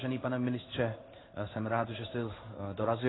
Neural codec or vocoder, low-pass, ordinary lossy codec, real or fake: codec, 16 kHz in and 24 kHz out, 1 kbps, XY-Tokenizer; 7.2 kHz; AAC, 16 kbps; fake